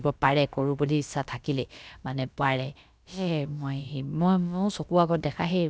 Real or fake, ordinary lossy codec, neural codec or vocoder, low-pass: fake; none; codec, 16 kHz, about 1 kbps, DyCAST, with the encoder's durations; none